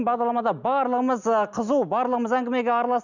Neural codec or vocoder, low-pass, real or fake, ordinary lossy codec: none; 7.2 kHz; real; none